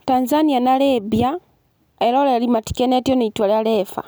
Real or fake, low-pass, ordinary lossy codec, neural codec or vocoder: real; none; none; none